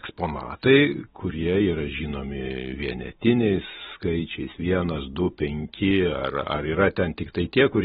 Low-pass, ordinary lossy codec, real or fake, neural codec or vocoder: 10.8 kHz; AAC, 16 kbps; real; none